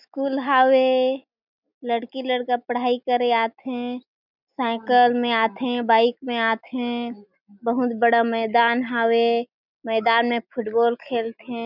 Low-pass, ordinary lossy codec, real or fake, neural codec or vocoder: 5.4 kHz; none; real; none